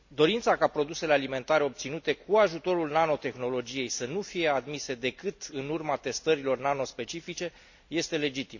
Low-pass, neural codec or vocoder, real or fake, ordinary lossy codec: 7.2 kHz; none; real; none